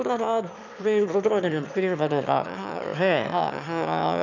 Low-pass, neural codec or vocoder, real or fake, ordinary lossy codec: 7.2 kHz; autoencoder, 22.05 kHz, a latent of 192 numbers a frame, VITS, trained on one speaker; fake; none